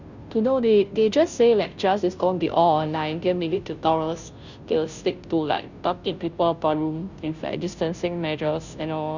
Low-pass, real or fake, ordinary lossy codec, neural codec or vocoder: 7.2 kHz; fake; none; codec, 16 kHz, 0.5 kbps, FunCodec, trained on Chinese and English, 25 frames a second